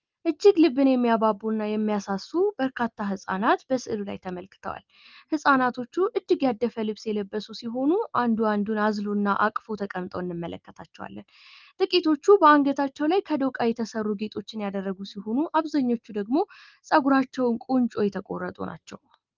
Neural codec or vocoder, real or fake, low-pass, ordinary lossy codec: none; real; 7.2 kHz; Opus, 32 kbps